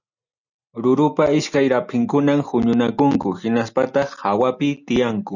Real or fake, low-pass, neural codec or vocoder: real; 7.2 kHz; none